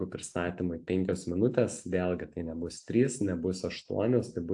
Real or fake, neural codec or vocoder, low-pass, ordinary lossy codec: real; none; 10.8 kHz; AAC, 64 kbps